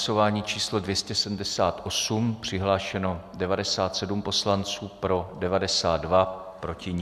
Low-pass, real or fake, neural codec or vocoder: 14.4 kHz; real; none